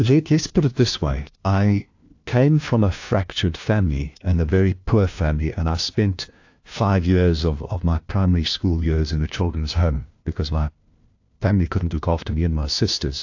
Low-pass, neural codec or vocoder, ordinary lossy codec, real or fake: 7.2 kHz; codec, 16 kHz, 1 kbps, FunCodec, trained on LibriTTS, 50 frames a second; AAC, 48 kbps; fake